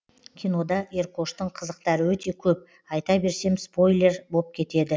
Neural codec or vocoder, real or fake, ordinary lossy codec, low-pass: none; real; none; none